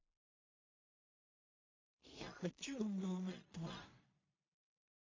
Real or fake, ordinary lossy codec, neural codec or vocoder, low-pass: fake; MP3, 32 kbps; codec, 16 kHz in and 24 kHz out, 0.4 kbps, LongCat-Audio-Codec, two codebook decoder; 7.2 kHz